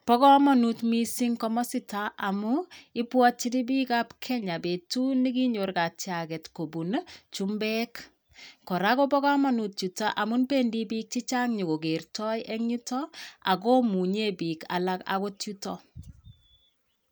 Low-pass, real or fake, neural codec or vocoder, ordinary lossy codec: none; real; none; none